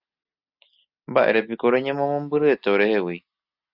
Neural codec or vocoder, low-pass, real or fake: none; 5.4 kHz; real